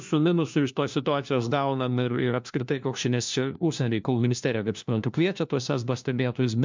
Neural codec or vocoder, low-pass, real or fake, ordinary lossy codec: codec, 16 kHz, 1 kbps, FunCodec, trained on LibriTTS, 50 frames a second; 7.2 kHz; fake; MP3, 64 kbps